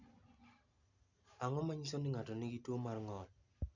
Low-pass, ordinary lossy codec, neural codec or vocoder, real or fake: 7.2 kHz; none; none; real